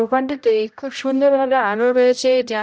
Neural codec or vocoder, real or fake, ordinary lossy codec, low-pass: codec, 16 kHz, 0.5 kbps, X-Codec, HuBERT features, trained on general audio; fake; none; none